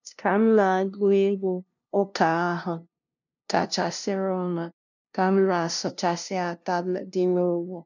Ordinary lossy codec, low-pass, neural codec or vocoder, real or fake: none; 7.2 kHz; codec, 16 kHz, 0.5 kbps, FunCodec, trained on LibriTTS, 25 frames a second; fake